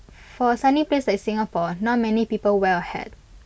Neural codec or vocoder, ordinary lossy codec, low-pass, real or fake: none; none; none; real